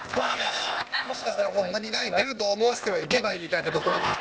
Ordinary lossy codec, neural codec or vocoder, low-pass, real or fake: none; codec, 16 kHz, 0.8 kbps, ZipCodec; none; fake